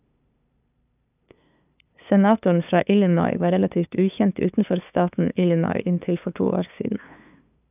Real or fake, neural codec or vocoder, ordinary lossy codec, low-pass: fake; codec, 16 kHz, 2 kbps, FunCodec, trained on LibriTTS, 25 frames a second; none; 3.6 kHz